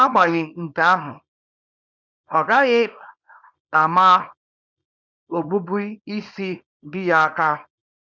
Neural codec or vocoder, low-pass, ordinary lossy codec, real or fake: codec, 24 kHz, 0.9 kbps, WavTokenizer, small release; 7.2 kHz; none; fake